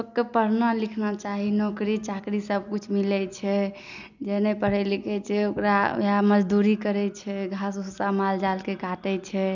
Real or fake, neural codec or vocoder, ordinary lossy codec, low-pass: real; none; none; 7.2 kHz